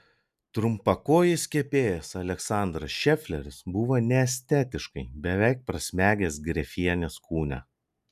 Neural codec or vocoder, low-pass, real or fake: none; 14.4 kHz; real